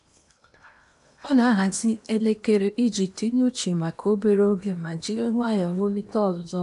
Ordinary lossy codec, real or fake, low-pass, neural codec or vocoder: none; fake; 10.8 kHz; codec, 16 kHz in and 24 kHz out, 0.8 kbps, FocalCodec, streaming, 65536 codes